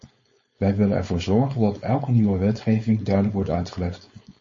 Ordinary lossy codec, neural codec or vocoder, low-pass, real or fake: MP3, 32 kbps; codec, 16 kHz, 4.8 kbps, FACodec; 7.2 kHz; fake